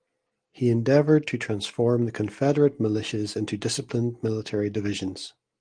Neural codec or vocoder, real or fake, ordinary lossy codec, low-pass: none; real; Opus, 24 kbps; 9.9 kHz